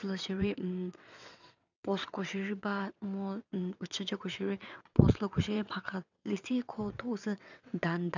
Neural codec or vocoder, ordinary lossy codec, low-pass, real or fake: vocoder, 22.05 kHz, 80 mel bands, WaveNeXt; none; 7.2 kHz; fake